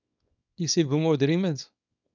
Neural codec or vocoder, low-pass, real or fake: codec, 24 kHz, 0.9 kbps, WavTokenizer, small release; 7.2 kHz; fake